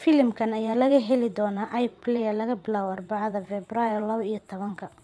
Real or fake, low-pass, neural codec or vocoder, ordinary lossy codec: fake; none; vocoder, 22.05 kHz, 80 mel bands, WaveNeXt; none